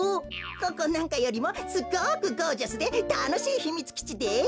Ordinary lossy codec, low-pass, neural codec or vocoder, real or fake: none; none; none; real